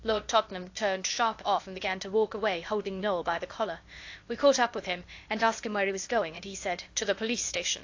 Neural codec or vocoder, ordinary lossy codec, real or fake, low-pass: codec, 16 kHz, 0.8 kbps, ZipCodec; AAC, 48 kbps; fake; 7.2 kHz